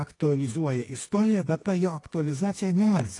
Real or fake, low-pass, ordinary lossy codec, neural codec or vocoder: fake; 10.8 kHz; AAC, 48 kbps; codec, 24 kHz, 0.9 kbps, WavTokenizer, medium music audio release